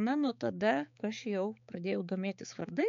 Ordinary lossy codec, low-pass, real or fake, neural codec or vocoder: MP3, 64 kbps; 7.2 kHz; fake; codec, 16 kHz, 4 kbps, X-Codec, HuBERT features, trained on balanced general audio